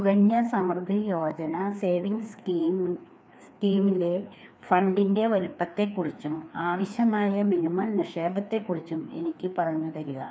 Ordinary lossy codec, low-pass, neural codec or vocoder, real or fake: none; none; codec, 16 kHz, 2 kbps, FreqCodec, larger model; fake